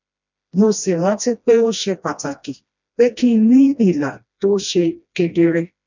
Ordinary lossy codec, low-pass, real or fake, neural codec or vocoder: none; 7.2 kHz; fake; codec, 16 kHz, 1 kbps, FreqCodec, smaller model